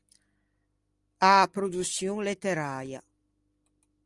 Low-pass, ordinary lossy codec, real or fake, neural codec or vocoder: 10.8 kHz; Opus, 32 kbps; real; none